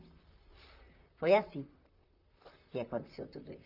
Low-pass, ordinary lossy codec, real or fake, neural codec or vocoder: 5.4 kHz; none; fake; vocoder, 44.1 kHz, 128 mel bands, Pupu-Vocoder